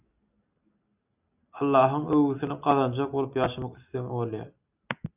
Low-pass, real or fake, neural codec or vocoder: 3.6 kHz; real; none